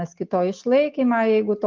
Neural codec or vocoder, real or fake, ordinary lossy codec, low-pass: none; real; Opus, 32 kbps; 7.2 kHz